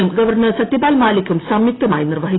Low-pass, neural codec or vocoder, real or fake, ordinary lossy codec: 7.2 kHz; none; real; AAC, 16 kbps